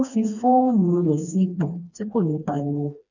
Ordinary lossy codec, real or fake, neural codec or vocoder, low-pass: none; fake; codec, 16 kHz, 2 kbps, FreqCodec, smaller model; 7.2 kHz